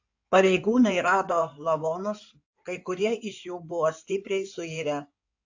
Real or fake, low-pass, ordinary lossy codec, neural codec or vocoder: fake; 7.2 kHz; AAC, 48 kbps; codec, 16 kHz in and 24 kHz out, 2.2 kbps, FireRedTTS-2 codec